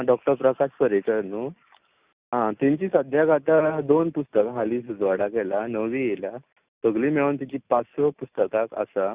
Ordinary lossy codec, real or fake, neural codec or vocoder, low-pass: Opus, 64 kbps; real; none; 3.6 kHz